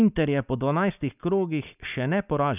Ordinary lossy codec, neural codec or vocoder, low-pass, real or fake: none; none; 3.6 kHz; real